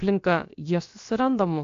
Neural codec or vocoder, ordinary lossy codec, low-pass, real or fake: codec, 16 kHz, about 1 kbps, DyCAST, with the encoder's durations; AAC, 48 kbps; 7.2 kHz; fake